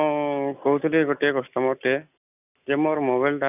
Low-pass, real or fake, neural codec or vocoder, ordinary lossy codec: 3.6 kHz; real; none; none